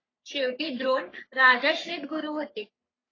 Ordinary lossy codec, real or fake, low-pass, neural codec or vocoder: AAC, 32 kbps; fake; 7.2 kHz; codec, 44.1 kHz, 3.4 kbps, Pupu-Codec